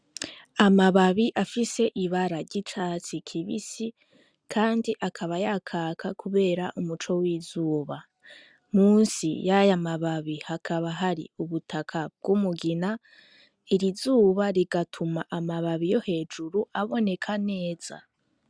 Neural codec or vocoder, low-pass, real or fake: none; 9.9 kHz; real